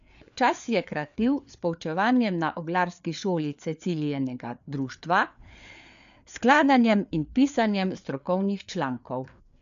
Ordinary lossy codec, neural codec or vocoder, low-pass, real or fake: none; codec, 16 kHz, 4 kbps, FreqCodec, larger model; 7.2 kHz; fake